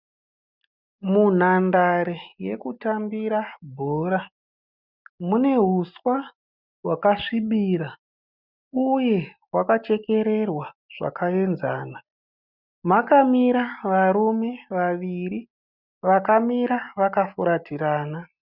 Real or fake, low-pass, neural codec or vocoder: real; 5.4 kHz; none